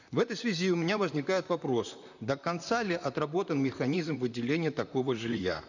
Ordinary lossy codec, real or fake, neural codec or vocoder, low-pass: none; fake; vocoder, 44.1 kHz, 128 mel bands, Pupu-Vocoder; 7.2 kHz